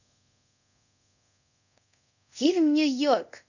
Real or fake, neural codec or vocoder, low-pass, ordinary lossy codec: fake; codec, 24 kHz, 0.5 kbps, DualCodec; 7.2 kHz; none